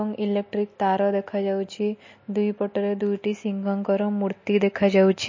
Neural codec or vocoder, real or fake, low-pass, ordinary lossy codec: none; real; 7.2 kHz; MP3, 32 kbps